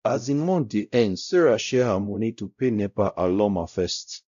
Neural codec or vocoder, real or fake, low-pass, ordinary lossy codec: codec, 16 kHz, 0.5 kbps, X-Codec, WavLM features, trained on Multilingual LibriSpeech; fake; 7.2 kHz; AAC, 96 kbps